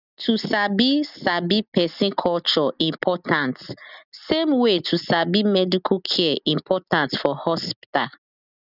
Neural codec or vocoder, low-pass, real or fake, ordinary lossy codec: none; 5.4 kHz; real; none